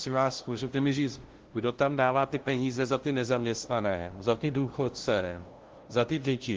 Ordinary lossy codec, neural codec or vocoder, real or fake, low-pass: Opus, 16 kbps; codec, 16 kHz, 0.5 kbps, FunCodec, trained on LibriTTS, 25 frames a second; fake; 7.2 kHz